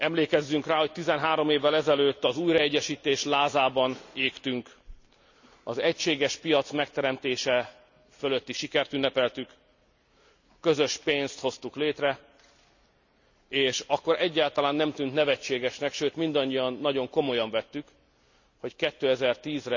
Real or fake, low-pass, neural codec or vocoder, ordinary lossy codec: real; 7.2 kHz; none; none